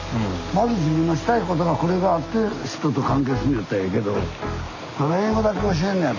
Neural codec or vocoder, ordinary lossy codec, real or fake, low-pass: none; none; real; 7.2 kHz